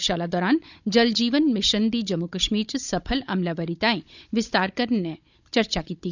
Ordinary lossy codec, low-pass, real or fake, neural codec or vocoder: none; 7.2 kHz; fake; codec, 16 kHz, 16 kbps, FunCodec, trained on Chinese and English, 50 frames a second